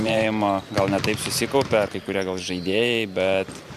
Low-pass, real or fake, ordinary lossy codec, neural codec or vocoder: 14.4 kHz; fake; AAC, 96 kbps; vocoder, 44.1 kHz, 128 mel bands every 512 samples, BigVGAN v2